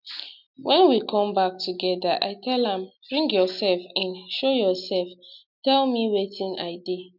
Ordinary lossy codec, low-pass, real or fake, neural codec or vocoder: none; 5.4 kHz; real; none